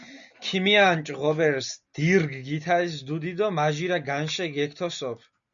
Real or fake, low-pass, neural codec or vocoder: real; 7.2 kHz; none